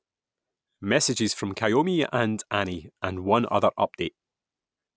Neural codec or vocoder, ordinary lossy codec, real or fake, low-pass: none; none; real; none